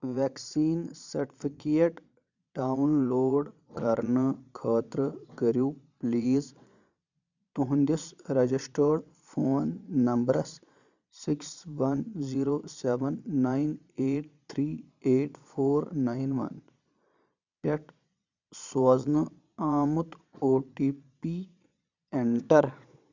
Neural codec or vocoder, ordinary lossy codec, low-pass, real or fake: vocoder, 22.05 kHz, 80 mel bands, Vocos; none; 7.2 kHz; fake